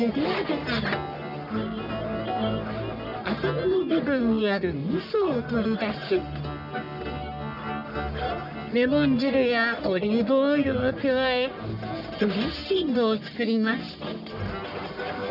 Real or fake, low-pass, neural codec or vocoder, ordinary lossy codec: fake; 5.4 kHz; codec, 44.1 kHz, 1.7 kbps, Pupu-Codec; none